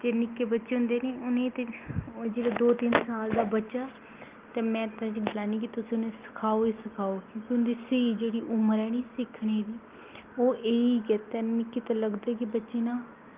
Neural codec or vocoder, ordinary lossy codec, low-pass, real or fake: none; Opus, 32 kbps; 3.6 kHz; real